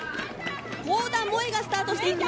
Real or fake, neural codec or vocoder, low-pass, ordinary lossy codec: real; none; none; none